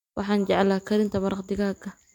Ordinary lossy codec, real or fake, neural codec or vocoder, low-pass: MP3, 96 kbps; real; none; 19.8 kHz